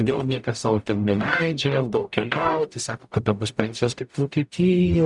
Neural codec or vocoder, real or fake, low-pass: codec, 44.1 kHz, 0.9 kbps, DAC; fake; 10.8 kHz